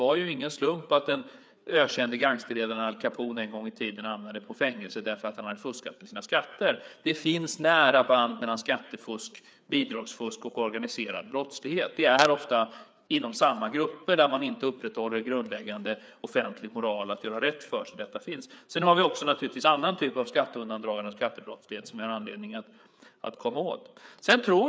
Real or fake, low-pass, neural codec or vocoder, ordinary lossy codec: fake; none; codec, 16 kHz, 4 kbps, FreqCodec, larger model; none